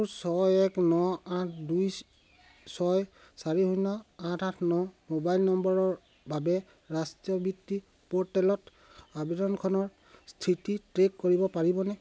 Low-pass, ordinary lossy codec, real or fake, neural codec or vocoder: none; none; real; none